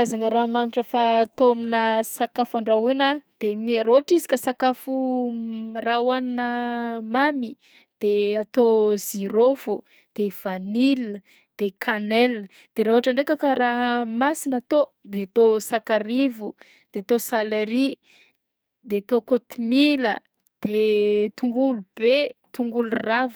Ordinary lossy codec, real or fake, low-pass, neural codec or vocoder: none; fake; none; codec, 44.1 kHz, 2.6 kbps, SNAC